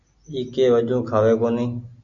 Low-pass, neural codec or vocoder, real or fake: 7.2 kHz; none; real